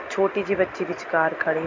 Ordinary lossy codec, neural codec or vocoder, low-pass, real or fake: MP3, 64 kbps; none; 7.2 kHz; real